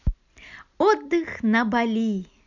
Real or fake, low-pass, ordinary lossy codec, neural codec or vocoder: real; 7.2 kHz; none; none